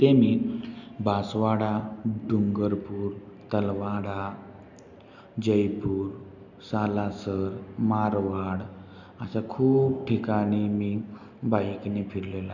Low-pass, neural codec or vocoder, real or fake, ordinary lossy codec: 7.2 kHz; none; real; none